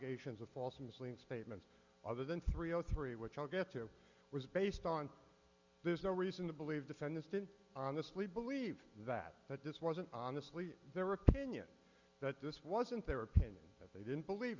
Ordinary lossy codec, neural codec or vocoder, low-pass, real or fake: Opus, 64 kbps; none; 7.2 kHz; real